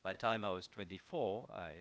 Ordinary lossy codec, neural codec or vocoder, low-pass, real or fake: none; codec, 16 kHz, 0.8 kbps, ZipCodec; none; fake